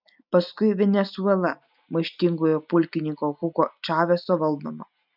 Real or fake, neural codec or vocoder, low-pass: real; none; 5.4 kHz